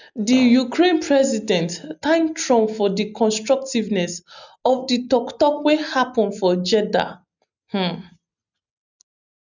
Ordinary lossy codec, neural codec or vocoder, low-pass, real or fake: none; none; 7.2 kHz; real